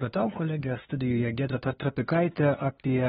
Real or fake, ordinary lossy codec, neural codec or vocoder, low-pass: fake; AAC, 16 kbps; codec, 16 kHz, 2 kbps, FunCodec, trained on LibriTTS, 25 frames a second; 7.2 kHz